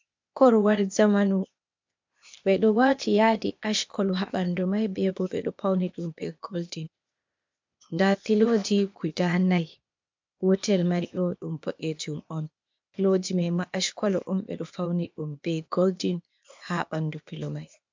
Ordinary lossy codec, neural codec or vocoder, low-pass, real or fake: MP3, 64 kbps; codec, 16 kHz, 0.8 kbps, ZipCodec; 7.2 kHz; fake